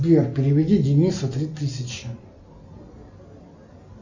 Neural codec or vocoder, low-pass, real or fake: none; 7.2 kHz; real